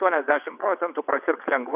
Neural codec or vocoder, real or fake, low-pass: vocoder, 22.05 kHz, 80 mel bands, WaveNeXt; fake; 3.6 kHz